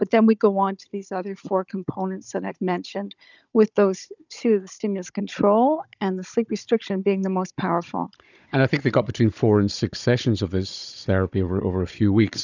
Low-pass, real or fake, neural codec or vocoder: 7.2 kHz; fake; codec, 16 kHz, 16 kbps, FunCodec, trained on Chinese and English, 50 frames a second